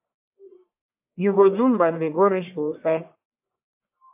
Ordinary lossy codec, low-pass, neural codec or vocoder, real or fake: AAC, 32 kbps; 3.6 kHz; codec, 44.1 kHz, 1.7 kbps, Pupu-Codec; fake